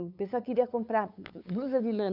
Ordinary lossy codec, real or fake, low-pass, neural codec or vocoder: none; fake; 5.4 kHz; codec, 16 kHz, 8 kbps, FunCodec, trained on LibriTTS, 25 frames a second